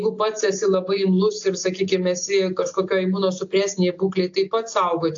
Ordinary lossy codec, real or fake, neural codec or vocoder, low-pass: AAC, 48 kbps; real; none; 7.2 kHz